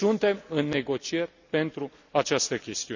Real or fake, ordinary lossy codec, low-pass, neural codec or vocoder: real; none; 7.2 kHz; none